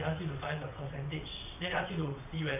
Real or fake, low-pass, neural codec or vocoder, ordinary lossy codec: fake; 3.6 kHz; vocoder, 22.05 kHz, 80 mel bands, WaveNeXt; AAC, 32 kbps